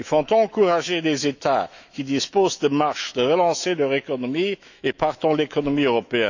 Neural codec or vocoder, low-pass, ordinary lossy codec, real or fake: autoencoder, 48 kHz, 128 numbers a frame, DAC-VAE, trained on Japanese speech; 7.2 kHz; none; fake